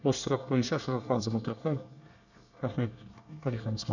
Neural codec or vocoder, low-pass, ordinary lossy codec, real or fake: codec, 24 kHz, 1 kbps, SNAC; 7.2 kHz; none; fake